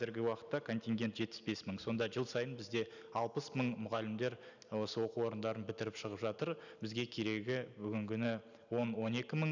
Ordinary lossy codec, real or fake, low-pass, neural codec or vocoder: none; real; 7.2 kHz; none